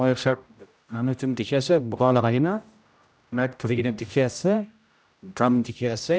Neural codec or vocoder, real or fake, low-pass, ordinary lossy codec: codec, 16 kHz, 0.5 kbps, X-Codec, HuBERT features, trained on general audio; fake; none; none